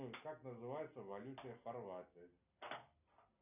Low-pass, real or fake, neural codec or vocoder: 3.6 kHz; real; none